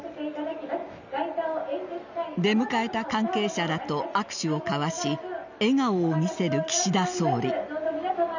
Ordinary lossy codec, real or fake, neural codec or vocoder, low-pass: none; real; none; 7.2 kHz